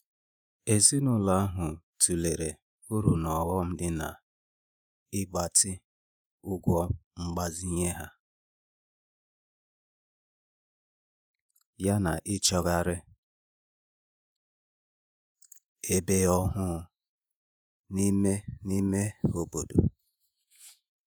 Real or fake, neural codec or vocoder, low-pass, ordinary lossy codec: fake; vocoder, 48 kHz, 128 mel bands, Vocos; none; none